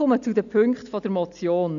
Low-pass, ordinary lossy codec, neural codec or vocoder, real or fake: 7.2 kHz; none; none; real